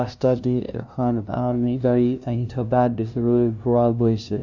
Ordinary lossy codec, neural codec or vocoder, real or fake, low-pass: none; codec, 16 kHz, 0.5 kbps, FunCodec, trained on LibriTTS, 25 frames a second; fake; 7.2 kHz